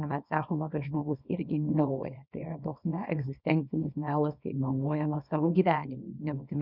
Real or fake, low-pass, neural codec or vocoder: fake; 5.4 kHz; codec, 24 kHz, 0.9 kbps, WavTokenizer, small release